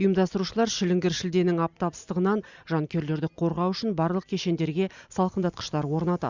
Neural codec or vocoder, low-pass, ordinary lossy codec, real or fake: none; 7.2 kHz; Opus, 64 kbps; real